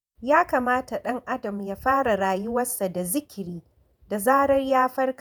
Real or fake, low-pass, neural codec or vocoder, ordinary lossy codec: fake; none; vocoder, 48 kHz, 128 mel bands, Vocos; none